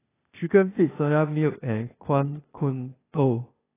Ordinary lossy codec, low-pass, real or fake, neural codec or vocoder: AAC, 16 kbps; 3.6 kHz; fake; codec, 16 kHz, 0.8 kbps, ZipCodec